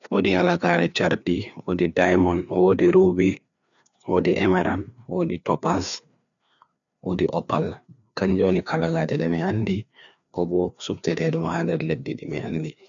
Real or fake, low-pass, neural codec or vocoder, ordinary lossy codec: fake; 7.2 kHz; codec, 16 kHz, 2 kbps, FreqCodec, larger model; none